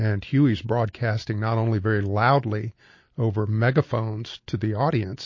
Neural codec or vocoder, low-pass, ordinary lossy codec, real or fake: none; 7.2 kHz; MP3, 32 kbps; real